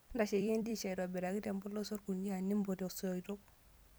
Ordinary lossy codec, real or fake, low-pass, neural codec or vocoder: none; fake; none; vocoder, 44.1 kHz, 128 mel bands every 256 samples, BigVGAN v2